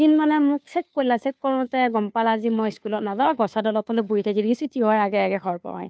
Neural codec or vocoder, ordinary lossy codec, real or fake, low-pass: codec, 16 kHz, 2 kbps, FunCodec, trained on Chinese and English, 25 frames a second; none; fake; none